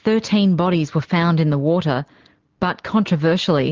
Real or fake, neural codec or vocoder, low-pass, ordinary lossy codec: real; none; 7.2 kHz; Opus, 16 kbps